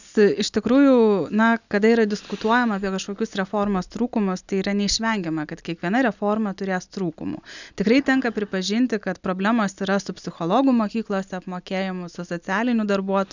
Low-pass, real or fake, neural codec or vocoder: 7.2 kHz; real; none